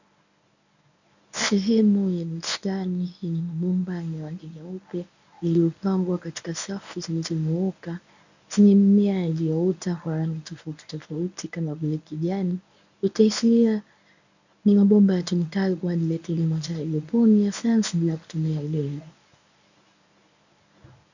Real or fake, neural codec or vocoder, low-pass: fake; codec, 24 kHz, 0.9 kbps, WavTokenizer, medium speech release version 1; 7.2 kHz